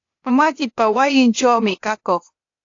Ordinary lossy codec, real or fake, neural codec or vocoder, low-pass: AAC, 48 kbps; fake; codec, 16 kHz, 0.8 kbps, ZipCodec; 7.2 kHz